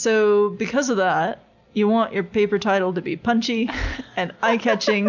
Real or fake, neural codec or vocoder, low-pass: real; none; 7.2 kHz